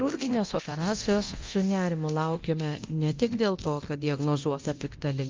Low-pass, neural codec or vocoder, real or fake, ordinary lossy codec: 7.2 kHz; codec, 24 kHz, 0.9 kbps, DualCodec; fake; Opus, 24 kbps